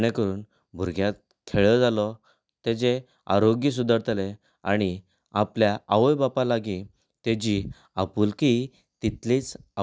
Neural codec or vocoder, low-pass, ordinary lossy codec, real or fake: none; none; none; real